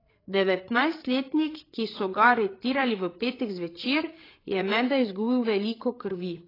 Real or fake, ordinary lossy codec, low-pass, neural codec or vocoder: fake; AAC, 24 kbps; 5.4 kHz; codec, 16 kHz, 8 kbps, FreqCodec, larger model